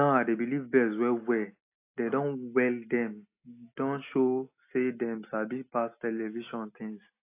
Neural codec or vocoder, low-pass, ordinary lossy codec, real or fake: none; 3.6 kHz; AAC, 24 kbps; real